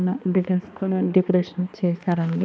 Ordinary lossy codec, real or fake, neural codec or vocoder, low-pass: none; fake; codec, 16 kHz, 2 kbps, X-Codec, HuBERT features, trained on balanced general audio; none